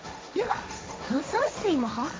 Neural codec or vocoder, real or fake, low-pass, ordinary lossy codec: codec, 16 kHz, 1.1 kbps, Voila-Tokenizer; fake; none; none